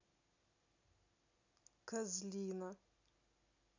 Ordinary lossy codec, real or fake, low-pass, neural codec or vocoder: none; real; 7.2 kHz; none